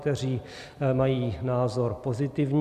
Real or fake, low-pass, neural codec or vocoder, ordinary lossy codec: real; 14.4 kHz; none; Opus, 64 kbps